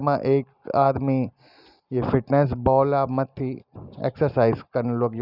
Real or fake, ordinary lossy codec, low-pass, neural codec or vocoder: real; none; 5.4 kHz; none